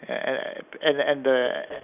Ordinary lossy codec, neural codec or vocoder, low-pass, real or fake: none; none; 3.6 kHz; real